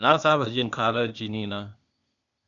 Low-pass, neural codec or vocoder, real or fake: 7.2 kHz; codec, 16 kHz, 0.8 kbps, ZipCodec; fake